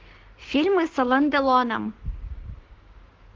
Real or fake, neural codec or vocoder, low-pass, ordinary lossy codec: fake; codec, 16 kHz, 6 kbps, DAC; 7.2 kHz; Opus, 16 kbps